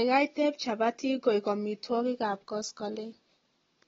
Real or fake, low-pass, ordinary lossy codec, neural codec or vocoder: real; 7.2 kHz; AAC, 24 kbps; none